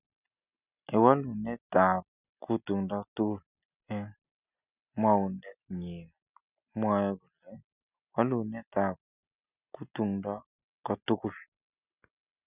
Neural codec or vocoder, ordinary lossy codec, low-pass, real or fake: none; none; 3.6 kHz; real